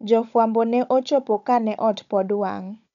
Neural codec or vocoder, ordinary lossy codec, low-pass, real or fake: codec, 16 kHz, 16 kbps, FunCodec, trained on Chinese and English, 50 frames a second; none; 7.2 kHz; fake